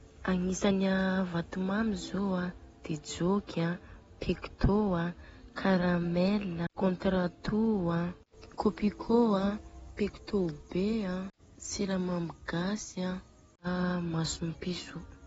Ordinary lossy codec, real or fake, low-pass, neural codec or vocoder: AAC, 24 kbps; real; 19.8 kHz; none